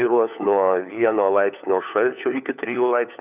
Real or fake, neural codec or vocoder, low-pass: fake; codec, 16 kHz, 4 kbps, FunCodec, trained on LibriTTS, 50 frames a second; 3.6 kHz